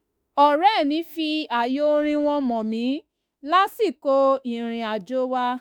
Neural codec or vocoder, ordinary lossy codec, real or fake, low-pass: autoencoder, 48 kHz, 32 numbers a frame, DAC-VAE, trained on Japanese speech; none; fake; none